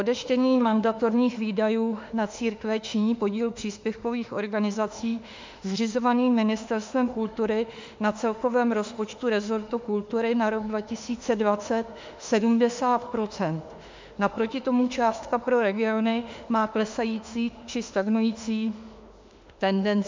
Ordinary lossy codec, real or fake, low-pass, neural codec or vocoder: MP3, 64 kbps; fake; 7.2 kHz; autoencoder, 48 kHz, 32 numbers a frame, DAC-VAE, trained on Japanese speech